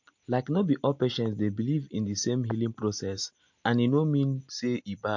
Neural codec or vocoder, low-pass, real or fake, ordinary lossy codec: none; 7.2 kHz; real; MP3, 48 kbps